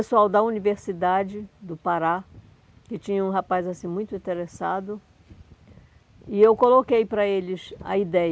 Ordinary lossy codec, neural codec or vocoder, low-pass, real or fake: none; none; none; real